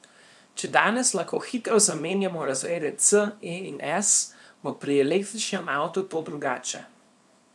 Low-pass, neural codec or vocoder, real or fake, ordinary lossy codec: none; codec, 24 kHz, 0.9 kbps, WavTokenizer, small release; fake; none